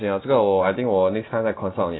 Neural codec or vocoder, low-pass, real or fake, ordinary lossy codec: none; 7.2 kHz; real; AAC, 16 kbps